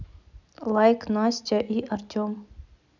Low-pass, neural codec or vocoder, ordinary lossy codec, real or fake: 7.2 kHz; none; none; real